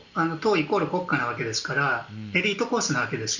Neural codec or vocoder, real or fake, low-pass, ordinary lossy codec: none; real; 7.2 kHz; Opus, 64 kbps